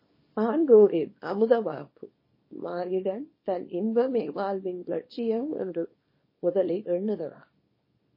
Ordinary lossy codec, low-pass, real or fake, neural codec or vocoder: MP3, 24 kbps; 5.4 kHz; fake; codec, 24 kHz, 0.9 kbps, WavTokenizer, small release